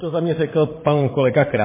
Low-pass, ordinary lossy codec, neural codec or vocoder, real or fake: 3.6 kHz; MP3, 16 kbps; codec, 16 kHz, 8 kbps, FreqCodec, larger model; fake